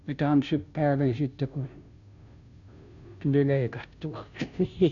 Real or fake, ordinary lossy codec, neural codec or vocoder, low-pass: fake; none; codec, 16 kHz, 0.5 kbps, FunCodec, trained on Chinese and English, 25 frames a second; 7.2 kHz